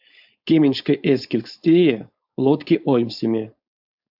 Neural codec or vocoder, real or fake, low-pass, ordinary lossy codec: codec, 16 kHz, 4.8 kbps, FACodec; fake; 5.4 kHz; AAC, 48 kbps